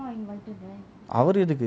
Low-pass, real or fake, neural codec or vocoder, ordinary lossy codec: none; real; none; none